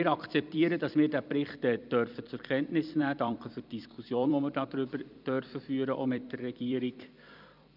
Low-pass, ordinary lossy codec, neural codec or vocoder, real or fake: 5.4 kHz; none; vocoder, 44.1 kHz, 128 mel bands every 256 samples, BigVGAN v2; fake